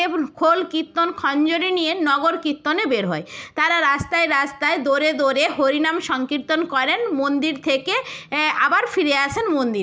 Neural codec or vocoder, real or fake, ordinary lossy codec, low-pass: none; real; none; none